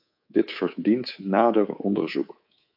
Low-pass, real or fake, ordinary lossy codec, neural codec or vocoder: 5.4 kHz; fake; AAC, 48 kbps; codec, 24 kHz, 3.1 kbps, DualCodec